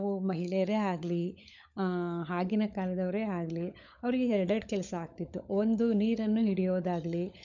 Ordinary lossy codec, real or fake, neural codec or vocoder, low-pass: none; fake; codec, 16 kHz, 16 kbps, FunCodec, trained on LibriTTS, 50 frames a second; 7.2 kHz